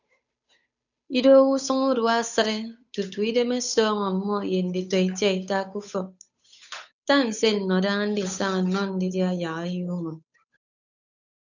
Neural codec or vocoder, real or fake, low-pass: codec, 16 kHz, 8 kbps, FunCodec, trained on Chinese and English, 25 frames a second; fake; 7.2 kHz